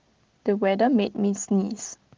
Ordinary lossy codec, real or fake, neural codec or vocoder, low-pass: Opus, 16 kbps; real; none; 7.2 kHz